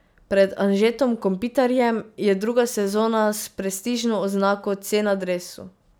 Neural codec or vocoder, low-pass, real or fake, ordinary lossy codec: none; none; real; none